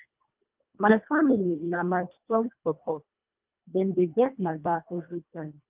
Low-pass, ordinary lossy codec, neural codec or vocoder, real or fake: 3.6 kHz; Opus, 24 kbps; codec, 24 kHz, 1.5 kbps, HILCodec; fake